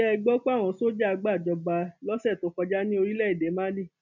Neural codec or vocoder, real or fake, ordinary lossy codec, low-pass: none; real; none; 7.2 kHz